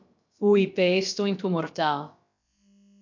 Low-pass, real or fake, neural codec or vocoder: 7.2 kHz; fake; codec, 16 kHz, about 1 kbps, DyCAST, with the encoder's durations